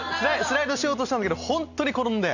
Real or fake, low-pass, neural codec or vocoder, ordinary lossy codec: real; 7.2 kHz; none; none